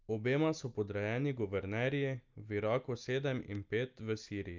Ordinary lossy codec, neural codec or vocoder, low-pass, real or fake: Opus, 32 kbps; none; 7.2 kHz; real